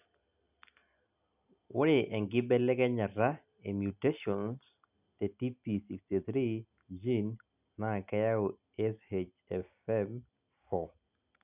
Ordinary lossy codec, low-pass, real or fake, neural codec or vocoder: none; 3.6 kHz; real; none